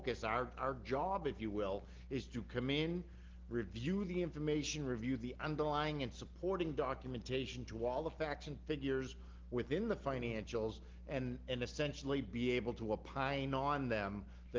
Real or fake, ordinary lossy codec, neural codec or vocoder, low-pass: real; Opus, 16 kbps; none; 7.2 kHz